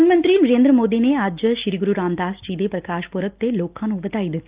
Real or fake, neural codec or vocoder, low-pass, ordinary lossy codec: real; none; 3.6 kHz; Opus, 24 kbps